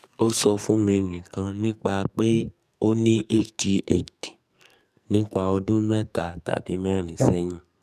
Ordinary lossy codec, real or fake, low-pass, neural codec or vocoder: none; fake; 14.4 kHz; codec, 44.1 kHz, 2.6 kbps, SNAC